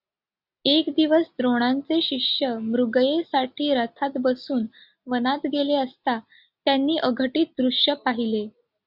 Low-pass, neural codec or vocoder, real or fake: 5.4 kHz; none; real